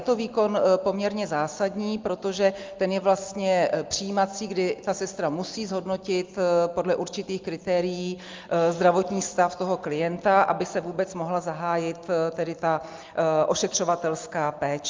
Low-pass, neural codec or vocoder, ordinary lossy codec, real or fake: 7.2 kHz; none; Opus, 32 kbps; real